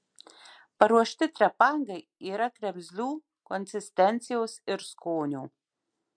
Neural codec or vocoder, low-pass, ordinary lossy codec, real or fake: none; 9.9 kHz; MP3, 64 kbps; real